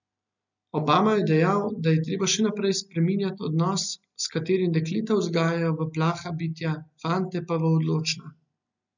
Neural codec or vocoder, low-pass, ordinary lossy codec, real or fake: none; 7.2 kHz; none; real